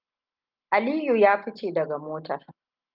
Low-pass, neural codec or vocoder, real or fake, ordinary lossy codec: 5.4 kHz; none; real; Opus, 24 kbps